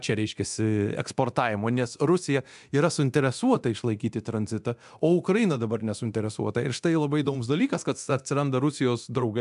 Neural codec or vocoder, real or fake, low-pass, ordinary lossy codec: codec, 24 kHz, 0.9 kbps, DualCodec; fake; 10.8 kHz; AAC, 96 kbps